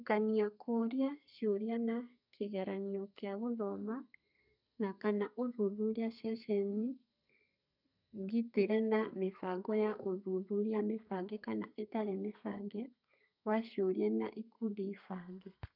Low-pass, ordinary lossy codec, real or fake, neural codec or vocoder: 5.4 kHz; none; fake; codec, 32 kHz, 1.9 kbps, SNAC